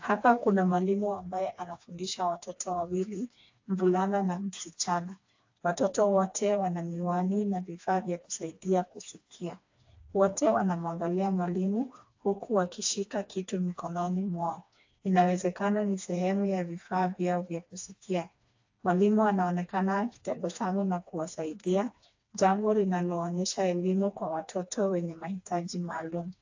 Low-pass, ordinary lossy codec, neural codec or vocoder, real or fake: 7.2 kHz; AAC, 48 kbps; codec, 16 kHz, 2 kbps, FreqCodec, smaller model; fake